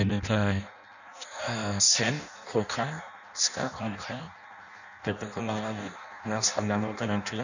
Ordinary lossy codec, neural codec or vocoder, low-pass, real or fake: none; codec, 16 kHz in and 24 kHz out, 0.6 kbps, FireRedTTS-2 codec; 7.2 kHz; fake